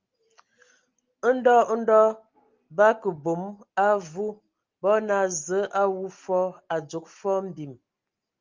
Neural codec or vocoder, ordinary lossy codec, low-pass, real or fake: none; Opus, 32 kbps; 7.2 kHz; real